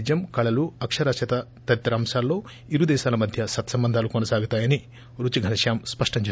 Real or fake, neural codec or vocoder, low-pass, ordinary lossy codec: real; none; none; none